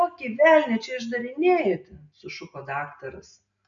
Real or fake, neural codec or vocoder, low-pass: real; none; 7.2 kHz